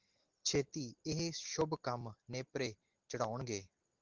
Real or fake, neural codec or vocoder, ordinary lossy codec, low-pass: real; none; Opus, 16 kbps; 7.2 kHz